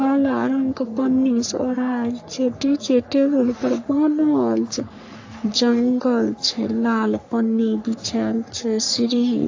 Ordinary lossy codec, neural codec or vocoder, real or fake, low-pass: AAC, 48 kbps; codec, 44.1 kHz, 3.4 kbps, Pupu-Codec; fake; 7.2 kHz